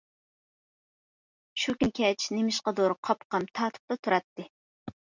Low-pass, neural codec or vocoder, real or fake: 7.2 kHz; none; real